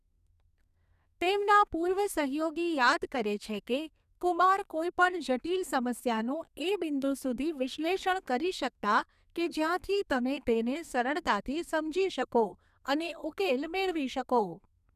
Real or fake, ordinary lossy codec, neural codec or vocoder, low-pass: fake; none; codec, 32 kHz, 1.9 kbps, SNAC; 14.4 kHz